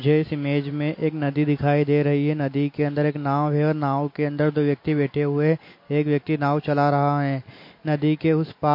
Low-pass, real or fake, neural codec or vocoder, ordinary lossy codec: 5.4 kHz; real; none; MP3, 32 kbps